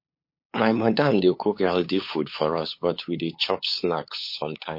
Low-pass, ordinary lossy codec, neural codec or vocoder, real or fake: 5.4 kHz; MP3, 32 kbps; codec, 16 kHz, 8 kbps, FunCodec, trained on LibriTTS, 25 frames a second; fake